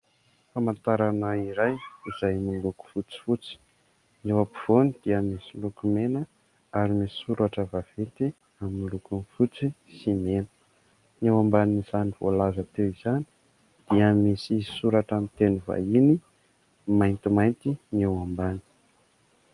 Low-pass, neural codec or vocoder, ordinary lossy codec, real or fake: 10.8 kHz; none; Opus, 32 kbps; real